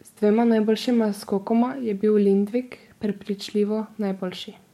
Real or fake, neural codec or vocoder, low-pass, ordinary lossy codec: fake; codec, 44.1 kHz, 7.8 kbps, DAC; 19.8 kHz; MP3, 64 kbps